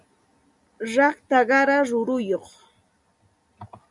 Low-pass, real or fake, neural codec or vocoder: 10.8 kHz; real; none